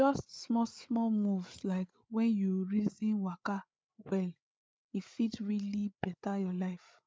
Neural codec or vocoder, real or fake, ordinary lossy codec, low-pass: codec, 16 kHz, 8 kbps, FreqCodec, larger model; fake; none; none